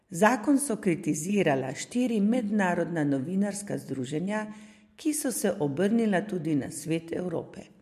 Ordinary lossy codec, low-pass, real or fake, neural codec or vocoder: MP3, 64 kbps; 14.4 kHz; real; none